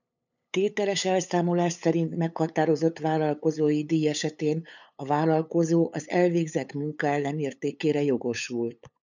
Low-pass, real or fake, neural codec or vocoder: 7.2 kHz; fake; codec, 16 kHz, 8 kbps, FunCodec, trained on LibriTTS, 25 frames a second